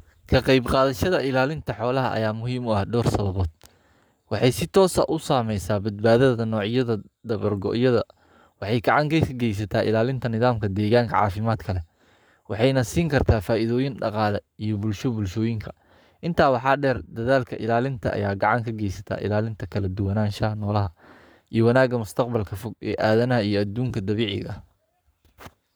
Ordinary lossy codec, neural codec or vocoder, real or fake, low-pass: none; codec, 44.1 kHz, 7.8 kbps, Pupu-Codec; fake; none